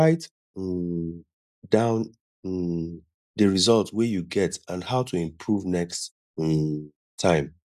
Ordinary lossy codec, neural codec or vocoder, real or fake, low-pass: none; none; real; 14.4 kHz